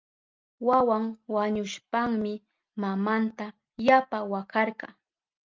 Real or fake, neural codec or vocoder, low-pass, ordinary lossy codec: real; none; 7.2 kHz; Opus, 24 kbps